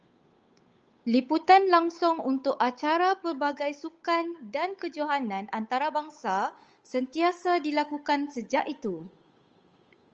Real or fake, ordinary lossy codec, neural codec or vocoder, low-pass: fake; Opus, 24 kbps; codec, 16 kHz, 8 kbps, FunCodec, trained on Chinese and English, 25 frames a second; 7.2 kHz